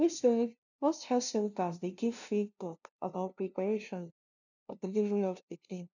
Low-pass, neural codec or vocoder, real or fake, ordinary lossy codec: 7.2 kHz; codec, 16 kHz, 0.5 kbps, FunCodec, trained on LibriTTS, 25 frames a second; fake; none